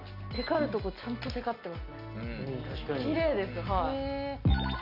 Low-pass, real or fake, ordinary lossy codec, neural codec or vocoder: 5.4 kHz; real; none; none